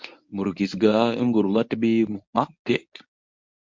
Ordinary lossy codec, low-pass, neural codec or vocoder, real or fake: MP3, 64 kbps; 7.2 kHz; codec, 24 kHz, 0.9 kbps, WavTokenizer, medium speech release version 1; fake